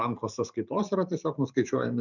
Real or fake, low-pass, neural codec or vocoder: fake; 7.2 kHz; vocoder, 44.1 kHz, 128 mel bands every 512 samples, BigVGAN v2